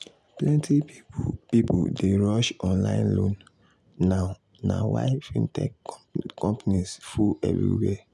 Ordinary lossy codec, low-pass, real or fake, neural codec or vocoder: none; none; real; none